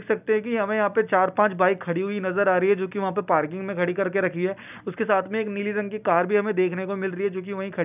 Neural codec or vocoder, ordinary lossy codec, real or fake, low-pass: none; none; real; 3.6 kHz